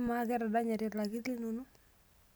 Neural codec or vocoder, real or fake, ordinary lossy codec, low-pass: none; real; none; none